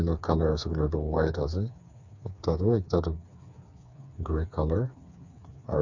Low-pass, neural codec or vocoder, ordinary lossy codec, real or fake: 7.2 kHz; codec, 16 kHz, 4 kbps, FreqCodec, smaller model; none; fake